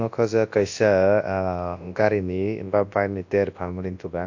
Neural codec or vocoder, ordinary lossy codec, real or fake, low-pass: codec, 24 kHz, 0.9 kbps, WavTokenizer, large speech release; AAC, 48 kbps; fake; 7.2 kHz